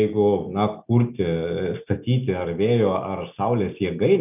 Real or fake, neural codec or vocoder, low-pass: real; none; 3.6 kHz